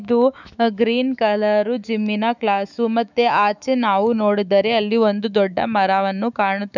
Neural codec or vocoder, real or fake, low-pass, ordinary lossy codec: autoencoder, 48 kHz, 128 numbers a frame, DAC-VAE, trained on Japanese speech; fake; 7.2 kHz; none